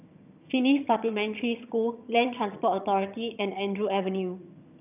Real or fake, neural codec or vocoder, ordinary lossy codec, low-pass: fake; vocoder, 22.05 kHz, 80 mel bands, HiFi-GAN; none; 3.6 kHz